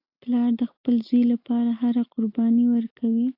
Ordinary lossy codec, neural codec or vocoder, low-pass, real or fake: AAC, 32 kbps; none; 5.4 kHz; real